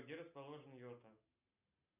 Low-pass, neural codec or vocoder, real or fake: 3.6 kHz; none; real